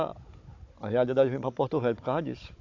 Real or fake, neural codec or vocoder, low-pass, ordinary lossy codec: fake; codec, 16 kHz, 16 kbps, FunCodec, trained on Chinese and English, 50 frames a second; 7.2 kHz; AAC, 48 kbps